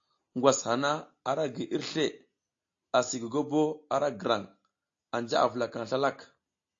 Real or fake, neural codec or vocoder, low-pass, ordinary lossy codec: real; none; 7.2 kHz; AAC, 48 kbps